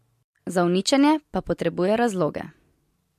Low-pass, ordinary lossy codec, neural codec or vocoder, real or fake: 14.4 kHz; MP3, 64 kbps; none; real